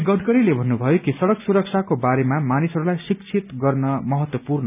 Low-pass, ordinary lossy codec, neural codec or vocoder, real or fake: 3.6 kHz; none; none; real